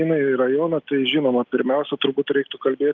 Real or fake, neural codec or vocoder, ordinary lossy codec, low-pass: real; none; Opus, 32 kbps; 7.2 kHz